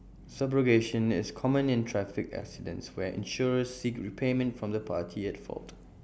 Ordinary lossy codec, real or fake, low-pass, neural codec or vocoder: none; real; none; none